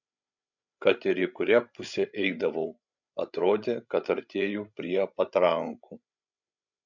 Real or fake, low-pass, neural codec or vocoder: fake; 7.2 kHz; codec, 16 kHz, 16 kbps, FreqCodec, larger model